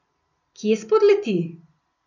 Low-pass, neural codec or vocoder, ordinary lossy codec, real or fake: 7.2 kHz; none; none; real